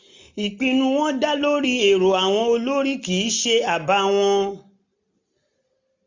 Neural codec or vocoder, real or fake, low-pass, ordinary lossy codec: none; real; 7.2 kHz; MP3, 64 kbps